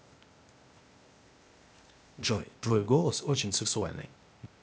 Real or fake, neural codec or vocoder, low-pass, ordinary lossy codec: fake; codec, 16 kHz, 0.8 kbps, ZipCodec; none; none